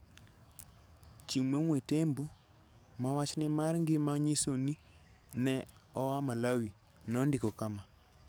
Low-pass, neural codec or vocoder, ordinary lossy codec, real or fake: none; codec, 44.1 kHz, 7.8 kbps, DAC; none; fake